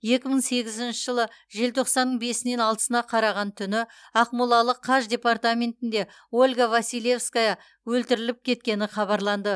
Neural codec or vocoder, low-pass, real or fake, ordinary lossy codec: none; none; real; none